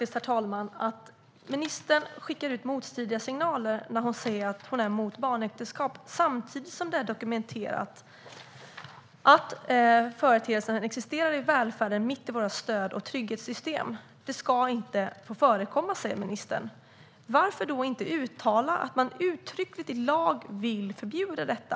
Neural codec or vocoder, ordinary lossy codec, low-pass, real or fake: none; none; none; real